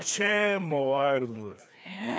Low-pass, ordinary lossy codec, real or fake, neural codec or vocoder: none; none; fake; codec, 16 kHz, 2 kbps, FreqCodec, larger model